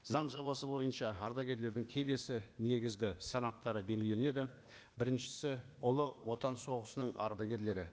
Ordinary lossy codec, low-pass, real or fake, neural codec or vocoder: none; none; fake; codec, 16 kHz, 0.8 kbps, ZipCodec